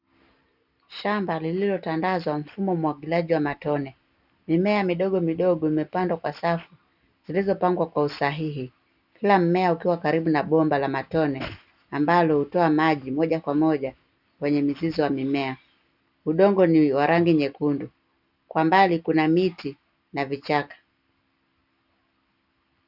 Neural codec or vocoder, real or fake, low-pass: none; real; 5.4 kHz